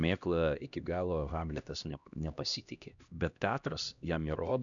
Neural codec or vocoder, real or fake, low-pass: codec, 16 kHz, 1 kbps, X-Codec, HuBERT features, trained on LibriSpeech; fake; 7.2 kHz